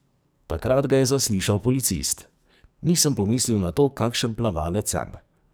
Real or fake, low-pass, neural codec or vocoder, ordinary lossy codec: fake; none; codec, 44.1 kHz, 2.6 kbps, SNAC; none